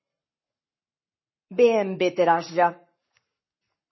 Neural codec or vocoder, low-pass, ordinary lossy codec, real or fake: codec, 44.1 kHz, 7.8 kbps, Pupu-Codec; 7.2 kHz; MP3, 24 kbps; fake